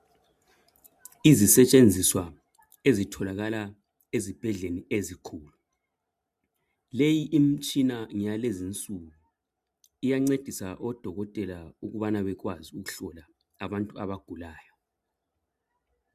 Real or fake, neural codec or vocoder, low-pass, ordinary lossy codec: real; none; 14.4 kHz; MP3, 96 kbps